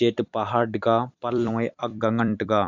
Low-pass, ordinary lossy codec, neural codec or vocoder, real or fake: 7.2 kHz; none; vocoder, 44.1 kHz, 128 mel bands every 256 samples, BigVGAN v2; fake